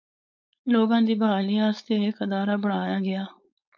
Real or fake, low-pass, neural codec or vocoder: fake; 7.2 kHz; codec, 16 kHz, 4.8 kbps, FACodec